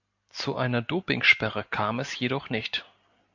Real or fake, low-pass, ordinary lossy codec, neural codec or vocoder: real; 7.2 kHz; AAC, 48 kbps; none